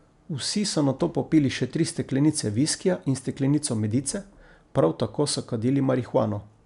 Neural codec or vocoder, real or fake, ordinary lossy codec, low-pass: none; real; none; 10.8 kHz